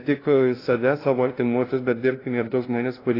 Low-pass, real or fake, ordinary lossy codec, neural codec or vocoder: 5.4 kHz; fake; AAC, 24 kbps; codec, 16 kHz, 0.5 kbps, FunCodec, trained on LibriTTS, 25 frames a second